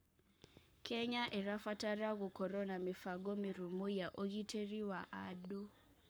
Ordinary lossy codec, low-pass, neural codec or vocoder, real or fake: none; none; codec, 44.1 kHz, 7.8 kbps, Pupu-Codec; fake